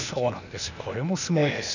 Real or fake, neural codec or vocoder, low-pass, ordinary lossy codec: fake; codec, 16 kHz, 0.8 kbps, ZipCodec; 7.2 kHz; none